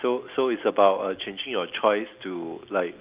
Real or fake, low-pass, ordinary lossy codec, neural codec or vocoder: real; 3.6 kHz; Opus, 24 kbps; none